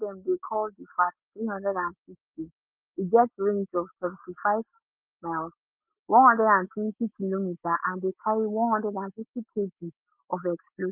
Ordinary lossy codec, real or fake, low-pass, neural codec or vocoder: Opus, 32 kbps; real; 3.6 kHz; none